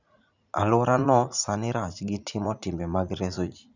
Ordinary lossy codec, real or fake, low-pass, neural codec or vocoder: none; fake; 7.2 kHz; vocoder, 44.1 kHz, 80 mel bands, Vocos